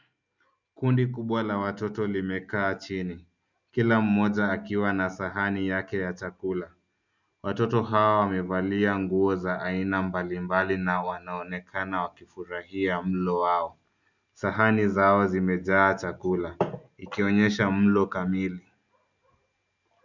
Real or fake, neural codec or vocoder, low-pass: real; none; 7.2 kHz